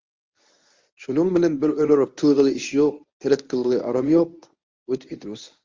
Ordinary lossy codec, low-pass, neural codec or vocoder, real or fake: Opus, 32 kbps; 7.2 kHz; codec, 24 kHz, 0.9 kbps, WavTokenizer, medium speech release version 1; fake